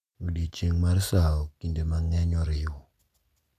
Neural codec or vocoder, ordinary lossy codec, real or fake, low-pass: none; MP3, 96 kbps; real; 14.4 kHz